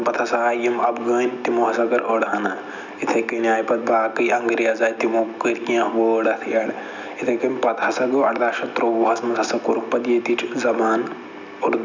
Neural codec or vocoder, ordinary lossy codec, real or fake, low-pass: none; none; real; 7.2 kHz